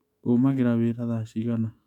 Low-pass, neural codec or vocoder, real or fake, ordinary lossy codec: 19.8 kHz; autoencoder, 48 kHz, 128 numbers a frame, DAC-VAE, trained on Japanese speech; fake; none